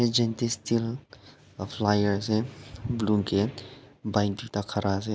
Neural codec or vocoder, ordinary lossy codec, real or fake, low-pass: none; none; real; none